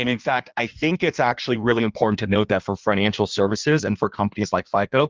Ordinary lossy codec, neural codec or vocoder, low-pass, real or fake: Opus, 32 kbps; codec, 16 kHz in and 24 kHz out, 1.1 kbps, FireRedTTS-2 codec; 7.2 kHz; fake